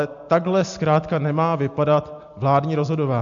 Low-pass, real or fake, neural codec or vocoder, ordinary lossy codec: 7.2 kHz; real; none; MP3, 64 kbps